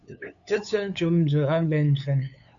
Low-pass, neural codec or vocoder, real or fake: 7.2 kHz; codec, 16 kHz, 8 kbps, FunCodec, trained on LibriTTS, 25 frames a second; fake